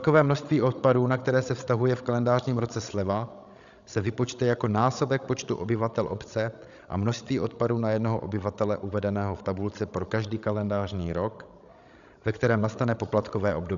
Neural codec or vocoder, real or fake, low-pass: codec, 16 kHz, 16 kbps, FreqCodec, larger model; fake; 7.2 kHz